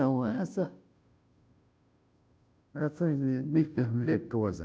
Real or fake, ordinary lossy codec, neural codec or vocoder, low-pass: fake; none; codec, 16 kHz, 0.5 kbps, FunCodec, trained on Chinese and English, 25 frames a second; none